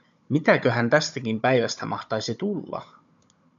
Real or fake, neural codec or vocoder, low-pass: fake; codec, 16 kHz, 16 kbps, FunCodec, trained on Chinese and English, 50 frames a second; 7.2 kHz